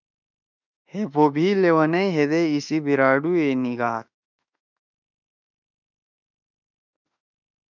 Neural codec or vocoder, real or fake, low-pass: autoencoder, 48 kHz, 32 numbers a frame, DAC-VAE, trained on Japanese speech; fake; 7.2 kHz